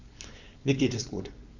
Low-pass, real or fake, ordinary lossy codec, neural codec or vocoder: 7.2 kHz; fake; none; codec, 16 kHz, 4.8 kbps, FACodec